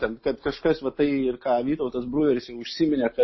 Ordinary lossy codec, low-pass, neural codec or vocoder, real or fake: MP3, 24 kbps; 7.2 kHz; none; real